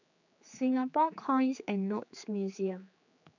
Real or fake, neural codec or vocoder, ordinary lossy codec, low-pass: fake; codec, 16 kHz, 4 kbps, X-Codec, HuBERT features, trained on general audio; none; 7.2 kHz